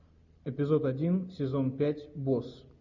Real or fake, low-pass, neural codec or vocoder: real; 7.2 kHz; none